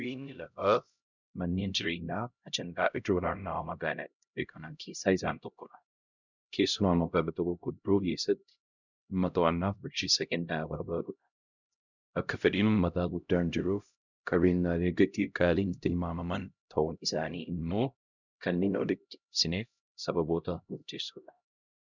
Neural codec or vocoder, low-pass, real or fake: codec, 16 kHz, 0.5 kbps, X-Codec, HuBERT features, trained on LibriSpeech; 7.2 kHz; fake